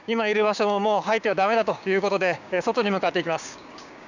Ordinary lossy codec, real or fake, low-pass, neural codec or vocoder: none; fake; 7.2 kHz; codec, 16 kHz, 6 kbps, DAC